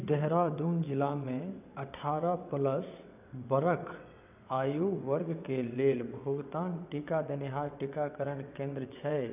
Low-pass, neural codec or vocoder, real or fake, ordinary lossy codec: 3.6 kHz; vocoder, 22.05 kHz, 80 mel bands, WaveNeXt; fake; none